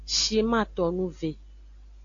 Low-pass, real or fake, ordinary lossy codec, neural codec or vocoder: 7.2 kHz; real; AAC, 48 kbps; none